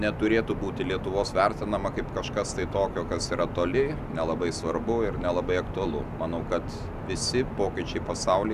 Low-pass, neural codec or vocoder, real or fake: 14.4 kHz; none; real